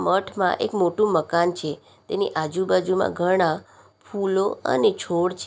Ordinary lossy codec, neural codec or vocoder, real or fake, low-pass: none; none; real; none